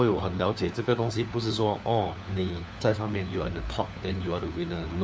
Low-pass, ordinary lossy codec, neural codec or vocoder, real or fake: none; none; codec, 16 kHz, 4 kbps, FunCodec, trained on LibriTTS, 50 frames a second; fake